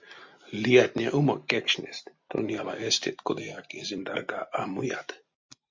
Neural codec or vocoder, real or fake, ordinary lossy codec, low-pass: none; real; MP3, 48 kbps; 7.2 kHz